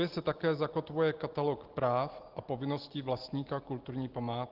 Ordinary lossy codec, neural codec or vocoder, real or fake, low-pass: Opus, 16 kbps; none; real; 5.4 kHz